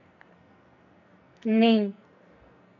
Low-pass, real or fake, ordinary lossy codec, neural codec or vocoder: 7.2 kHz; fake; none; codec, 44.1 kHz, 2.6 kbps, SNAC